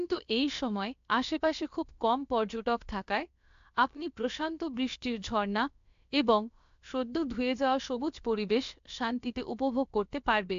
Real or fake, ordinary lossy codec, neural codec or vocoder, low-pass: fake; AAC, 64 kbps; codec, 16 kHz, about 1 kbps, DyCAST, with the encoder's durations; 7.2 kHz